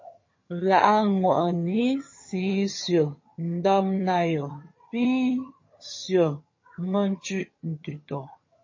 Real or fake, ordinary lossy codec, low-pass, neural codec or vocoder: fake; MP3, 32 kbps; 7.2 kHz; vocoder, 22.05 kHz, 80 mel bands, HiFi-GAN